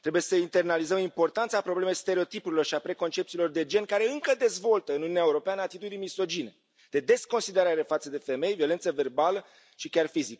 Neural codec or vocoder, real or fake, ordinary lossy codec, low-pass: none; real; none; none